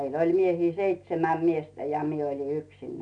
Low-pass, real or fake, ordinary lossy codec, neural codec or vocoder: 9.9 kHz; real; none; none